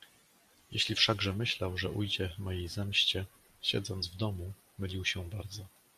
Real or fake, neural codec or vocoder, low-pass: real; none; 14.4 kHz